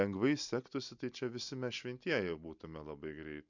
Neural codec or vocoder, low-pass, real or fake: none; 7.2 kHz; real